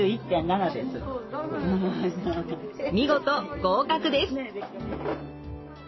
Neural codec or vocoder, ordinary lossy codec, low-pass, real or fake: none; MP3, 24 kbps; 7.2 kHz; real